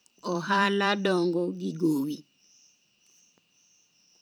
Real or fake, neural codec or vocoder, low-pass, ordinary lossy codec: fake; vocoder, 44.1 kHz, 128 mel bands every 512 samples, BigVGAN v2; none; none